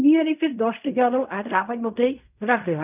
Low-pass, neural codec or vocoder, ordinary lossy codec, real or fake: 3.6 kHz; codec, 16 kHz in and 24 kHz out, 0.4 kbps, LongCat-Audio-Codec, fine tuned four codebook decoder; none; fake